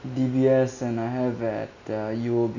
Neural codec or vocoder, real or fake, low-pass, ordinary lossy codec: none; real; 7.2 kHz; none